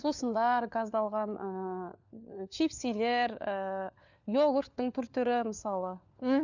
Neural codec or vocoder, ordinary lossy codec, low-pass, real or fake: codec, 16 kHz, 4 kbps, FunCodec, trained on LibriTTS, 50 frames a second; none; 7.2 kHz; fake